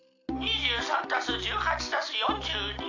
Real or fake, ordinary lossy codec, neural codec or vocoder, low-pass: real; AAC, 48 kbps; none; 7.2 kHz